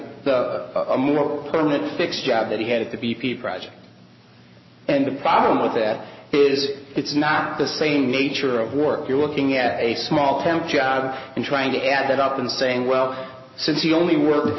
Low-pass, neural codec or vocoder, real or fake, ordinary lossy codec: 7.2 kHz; none; real; MP3, 24 kbps